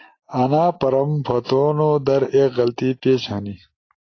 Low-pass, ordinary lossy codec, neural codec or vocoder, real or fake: 7.2 kHz; AAC, 32 kbps; none; real